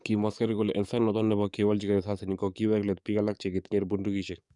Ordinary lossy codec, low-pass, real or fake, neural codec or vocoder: none; 10.8 kHz; fake; codec, 44.1 kHz, 7.8 kbps, DAC